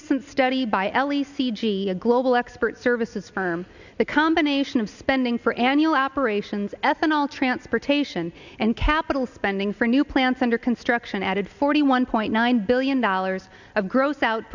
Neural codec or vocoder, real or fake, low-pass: none; real; 7.2 kHz